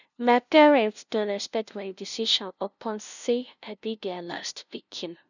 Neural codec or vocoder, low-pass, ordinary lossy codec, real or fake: codec, 16 kHz, 0.5 kbps, FunCodec, trained on LibriTTS, 25 frames a second; 7.2 kHz; none; fake